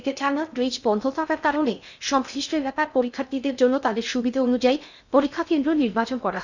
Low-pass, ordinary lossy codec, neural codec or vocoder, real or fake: 7.2 kHz; none; codec, 16 kHz in and 24 kHz out, 0.6 kbps, FocalCodec, streaming, 2048 codes; fake